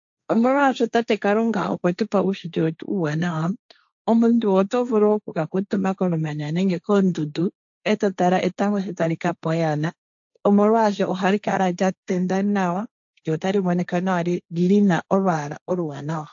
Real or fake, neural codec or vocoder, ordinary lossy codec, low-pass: fake; codec, 16 kHz, 1.1 kbps, Voila-Tokenizer; AAC, 64 kbps; 7.2 kHz